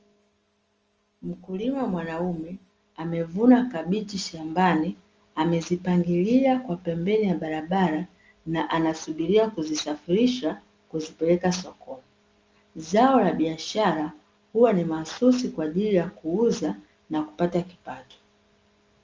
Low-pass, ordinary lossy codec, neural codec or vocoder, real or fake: 7.2 kHz; Opus, 24 kbps; none; real